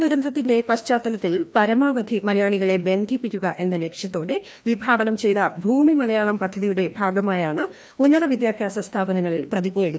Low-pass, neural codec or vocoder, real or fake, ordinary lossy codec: none; codec, 16 kHz, 1 kbps, FreqCodec, larger model; fake; none